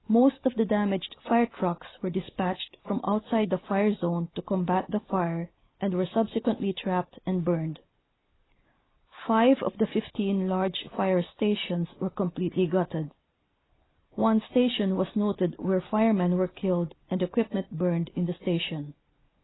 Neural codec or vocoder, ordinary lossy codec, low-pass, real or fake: none; AAC, 16 kbps; 7.2 kHz; real